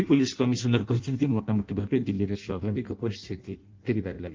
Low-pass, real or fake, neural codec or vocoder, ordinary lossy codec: 7.2 kHz; fake; codec, 16 kHz in and 24 kHz out, 0.6 kbps, FireRedTTS-2 codec; Opus, 24 kbps